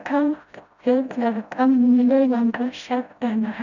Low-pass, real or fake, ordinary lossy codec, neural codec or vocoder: 7.2 kHz; fake; none; codec, 16 kHz, 0.5 kbps, FreqCodec, smaller model